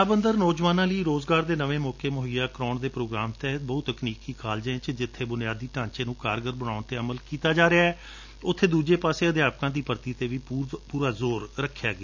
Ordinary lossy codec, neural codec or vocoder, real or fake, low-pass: none; none; real; 7.2 kHz